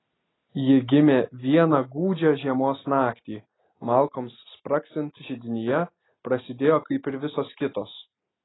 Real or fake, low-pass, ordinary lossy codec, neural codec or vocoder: real; 7.2 kHz; AAC, 16 kbps; none